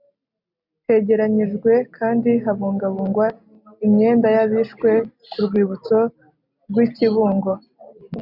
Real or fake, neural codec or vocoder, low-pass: real; none; 5.4 kHz